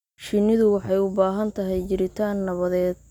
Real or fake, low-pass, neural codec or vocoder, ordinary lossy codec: real; 19.8 kHz; none; none